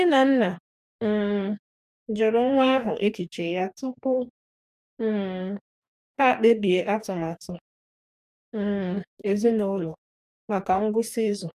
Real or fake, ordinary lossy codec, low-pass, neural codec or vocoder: fake; none; 14.4 kHz; codec, 44.1 kHz, 2.6 kbps, DAC